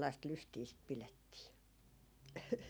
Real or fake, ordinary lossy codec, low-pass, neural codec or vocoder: real; none; none; none